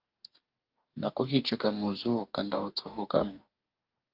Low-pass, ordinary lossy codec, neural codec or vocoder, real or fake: 5.4 kHz; Opus, 24 kbps; codec, 44.1 kHz, 2.6 kbps, DAC; fake